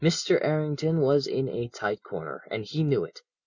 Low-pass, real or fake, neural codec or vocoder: 7.2 kHz; real; none